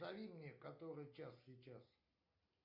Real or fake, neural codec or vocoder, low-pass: real; none; 5.4 kHz